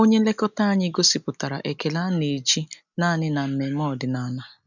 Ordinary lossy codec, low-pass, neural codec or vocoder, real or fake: none; 7.2 kHz; none; real